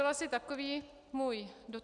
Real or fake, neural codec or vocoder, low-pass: real; none; 10.8 kHz